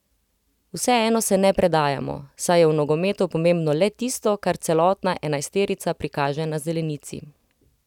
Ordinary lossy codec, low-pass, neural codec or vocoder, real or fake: none; 19.8 kHz; none; real